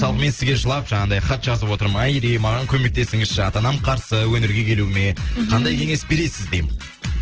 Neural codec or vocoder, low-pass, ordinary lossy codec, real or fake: none; 7.2 kHz; Opus, 16 kbps; real